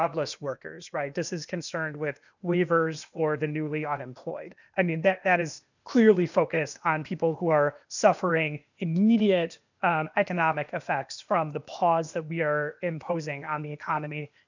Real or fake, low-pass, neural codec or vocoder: fake; 7.2 kHz; codec, 16 kHz, 0.8 kbps, ZipCodec